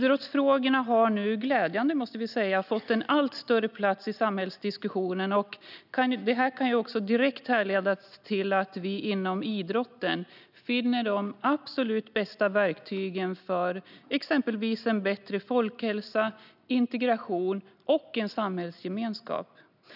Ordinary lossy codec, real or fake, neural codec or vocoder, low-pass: none; real; none; 5.4 kHz